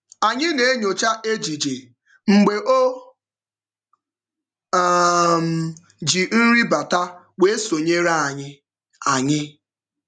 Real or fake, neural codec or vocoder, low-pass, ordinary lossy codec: real; none; 9.9 kHz; none